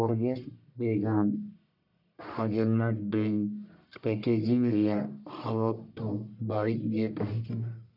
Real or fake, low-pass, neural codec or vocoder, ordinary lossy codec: fake; 5.4 kHz; codec, 44.1 kHz, 1.7 kbps, Pupu-Codec; none